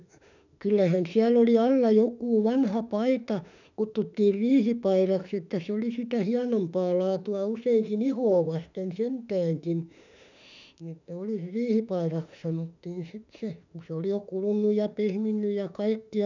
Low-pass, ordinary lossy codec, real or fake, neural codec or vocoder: 7.2 kHz; none; fake; autoencoder, 48 kHz, 32 numbers a frame, DAC-VAE, trained on Japanese speech